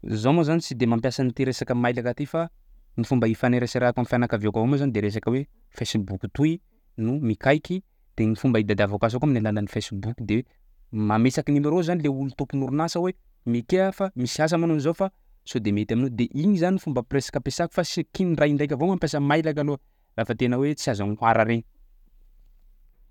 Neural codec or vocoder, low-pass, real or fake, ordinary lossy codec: none; 19.8 kHz; real; none